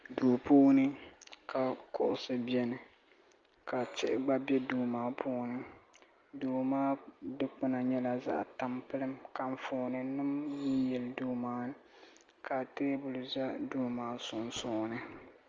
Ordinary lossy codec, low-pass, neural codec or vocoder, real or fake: Opus, 32 kbps; 7.2 kHz; none; real